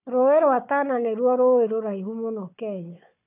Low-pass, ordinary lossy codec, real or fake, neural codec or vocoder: 3.6 kHz; none; fake; codec, 16 kHz, 4 kbps, FunCodec, trained on Chinese and English, 50 frames a second